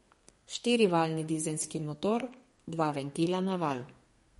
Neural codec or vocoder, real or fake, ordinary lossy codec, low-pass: codec, 32 kHz, 1.9 kbps, SNAC; fake; MP3, 48 kbps; 14.4 kHz